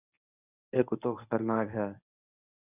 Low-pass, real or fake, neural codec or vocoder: 3.6 kHz; fake; codec, 24 kHz, 0.9 kbps, WavTokenizer, medium speech release version 1